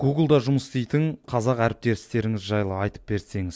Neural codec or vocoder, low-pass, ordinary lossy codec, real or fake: none; none; none; real